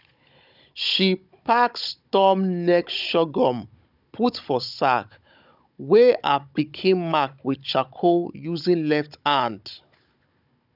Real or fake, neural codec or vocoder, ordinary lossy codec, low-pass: fake; codec, 16 kHz, 16 kbps, FunCodec, trained on Chinese and English, 50 frames a second; none; 5.4 kHz